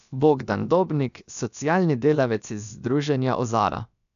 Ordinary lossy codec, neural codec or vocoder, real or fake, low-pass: none; codec, 16 kHz, about 1 kbps, DyCAST, with the encoder's durations; fake; 7.2 kHz